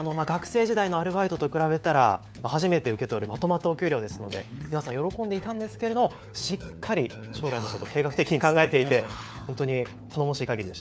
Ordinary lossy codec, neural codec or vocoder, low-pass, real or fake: none; codec, 16 kHz, 4 kbps, FunCodec, trained on LibriTTS, 50 frames a second; none; fake